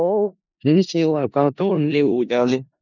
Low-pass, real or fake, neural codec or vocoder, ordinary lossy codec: 7.2 kHz; fake; codec, 16 kHz in and 24 kHz out, 0.4 kbps, LongCat-Audio-Codec, four codebook decoder; none